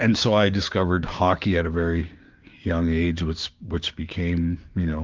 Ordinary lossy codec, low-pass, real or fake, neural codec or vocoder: Opus, 24 kbps; 7.2 kHz; fake; codec, 16 kHz, 6 kbps, DAC